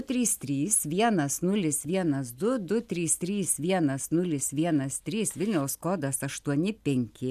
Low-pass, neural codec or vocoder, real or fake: 14.4 kHz; none; real